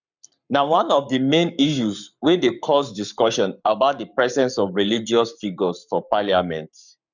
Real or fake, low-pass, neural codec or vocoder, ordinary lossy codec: fake; 7.2 kHz; codec, 44.1 kHz, 7.8 kbps, Pupu-Codec; none